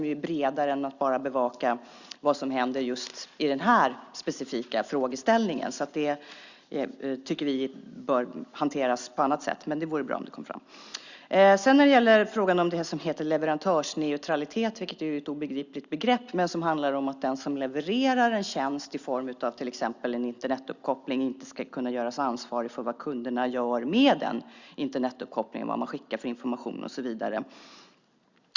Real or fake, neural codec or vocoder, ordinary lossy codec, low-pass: real; none; Opus, 64 kbps; 7.2 kHz